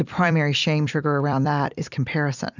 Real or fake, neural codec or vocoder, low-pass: fake; vocoder, 44.1 kHz, 128 mel bands every 256 samples, BigVGAN v2; 7.2 kHz